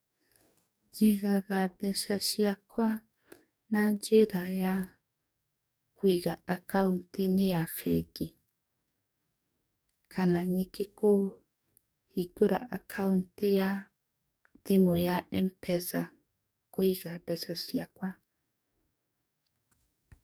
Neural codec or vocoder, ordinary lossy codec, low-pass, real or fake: codec, 44.1 kHz, 2.6 kbps, DAC; none; none; fake